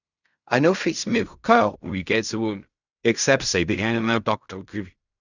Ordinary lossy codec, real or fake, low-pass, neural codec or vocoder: none; fake; 7.2 kHz; codec, 16 kHz in and 24 kHz out, 0.4 kbps, LongCat-Audio-Codec, fine tuned four codebook decoder